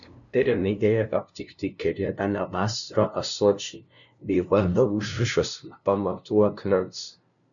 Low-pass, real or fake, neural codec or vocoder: 7.2 kHz; fake; codec, 16 kHz, 0.5 kbps, FunCodec, trained on LibriTTS, 25 frames a second